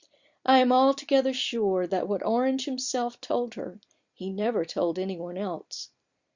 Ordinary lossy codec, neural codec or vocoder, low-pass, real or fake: Opus, 64 kbps; vocoder, 44.1 kHz, 128 mel bands every 256 samples, BigVGAN v2; 7.2 kHz; fake